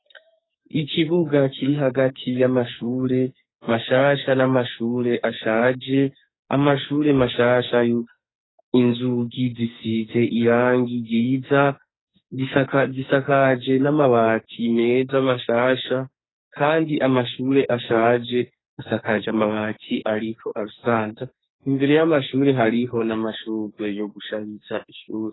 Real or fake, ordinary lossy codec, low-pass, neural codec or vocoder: fake; AAC, 16 kbps; 7.2 kHz; codec, 44.1 kHz, 2.6 kbps, SNAC